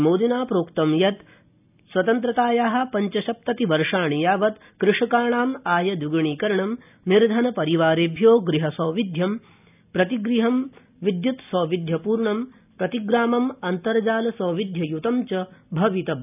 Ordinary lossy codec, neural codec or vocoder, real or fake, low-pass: none; none; real; 3.6 kHz